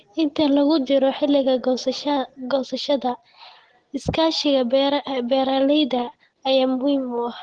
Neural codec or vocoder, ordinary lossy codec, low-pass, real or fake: none; Opus, 16 kbps; 9.9 kHz; real